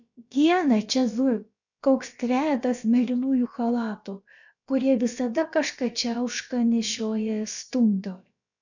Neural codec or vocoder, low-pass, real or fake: codec, 16 kHz, about 1 kbps, DyCAST, with the encoder's durations; 7.2 kHz; fake